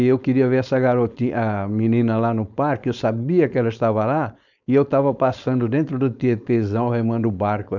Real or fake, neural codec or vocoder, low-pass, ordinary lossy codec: fake; codec, 16 kHz, 4.8 kbps, FACodec; 7.2 kHz; none